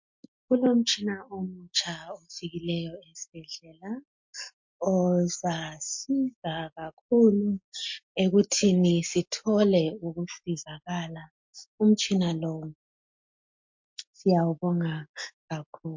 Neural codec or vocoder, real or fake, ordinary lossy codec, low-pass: none; real; MP3, 48 kbps; 7.2 kHz